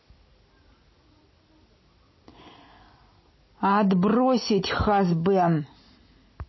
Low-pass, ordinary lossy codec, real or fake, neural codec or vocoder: 7.2 kHz; MP3, 24 kbps; real; none